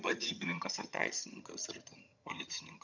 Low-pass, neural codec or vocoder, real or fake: 7.2 kHz; vocoder, 22.05 kHz, 80 mel bands, HiFi-GAN; fake